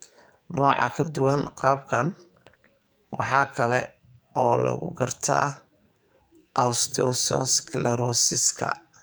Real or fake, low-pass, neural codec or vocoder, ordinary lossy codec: fake; none; codec, 44.1 kHz, 2.6 kbps, SNAC; none